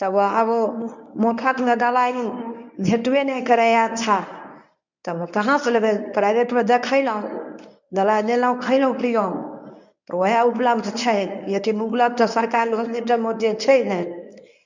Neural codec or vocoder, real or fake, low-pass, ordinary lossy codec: codec, 24 kHz, 0.9 kbps, WavTokenizer, medium speech release version 1; fake; 7.2 kHz; none